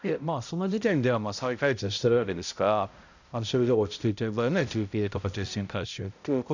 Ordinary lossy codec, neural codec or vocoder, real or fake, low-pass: none; codec, 16 kHz, 0.5 kbps, X-Codec, HuBERT features, trained on balanced general audio; fake; 7.2 kHz